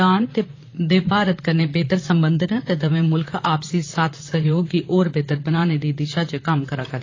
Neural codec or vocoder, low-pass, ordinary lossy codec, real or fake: vocoder, 44.1 kHz, 128 mel bands, Pupu-Vocoder; 7.2 kHz; AAC, 32 kbps; fake